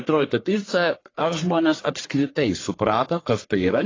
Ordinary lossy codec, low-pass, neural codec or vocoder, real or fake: AAC, 32 kbps; 7.2 kHz; codec, 44.1 kHz, 1.7 kbps, Pupu-Codec; fake